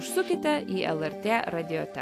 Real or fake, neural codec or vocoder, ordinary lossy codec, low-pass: real; none; AAC, 64 kbps; 14.4 kHz